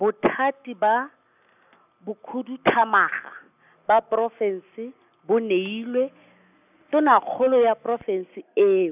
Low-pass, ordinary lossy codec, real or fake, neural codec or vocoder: 3.6 kHz; none; real; none